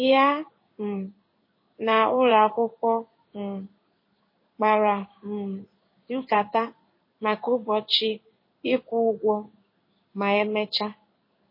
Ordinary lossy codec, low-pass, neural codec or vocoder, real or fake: MP3, 24 kbps; 5.4 kHz; codec, 16 kHz in and 24 kHz out, 1 kbps, XY-Tokenizer; fake